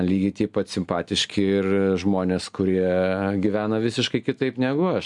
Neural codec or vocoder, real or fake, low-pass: none; real; 10.8 kHz